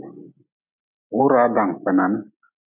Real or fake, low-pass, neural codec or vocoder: real; 3.6 kHz; none